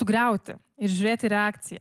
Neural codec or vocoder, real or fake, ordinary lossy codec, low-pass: none; real; Opus, 24 kbps; 14.4 kHz